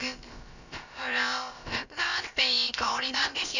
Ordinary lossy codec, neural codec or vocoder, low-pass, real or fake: none; codec, 16 kHz, about 1 kbps, DyCAST, with the encoder's durations; 7.2 kHz; fake